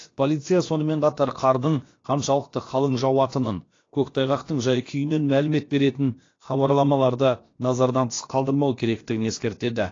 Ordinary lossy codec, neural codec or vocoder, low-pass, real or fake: AAC, 32 kbps; codec, 16 kHz, about 1 kbps, DyCAST, with the encoder's durations; 7.2 kHz; fake